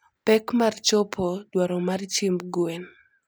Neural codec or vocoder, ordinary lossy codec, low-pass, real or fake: none; none; none; real